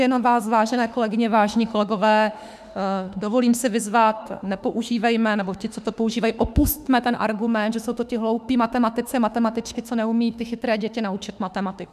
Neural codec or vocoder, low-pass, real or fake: autoencoder, 48 kHz, 32 numbers a frame, DAC-VAE, trained on Japanese speech; 14.4 kHz; fake